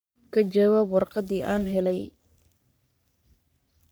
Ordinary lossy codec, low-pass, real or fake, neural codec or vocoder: none; none; fake; codec, 44.1 kHz, 3.4 kbps, Pupu-Codec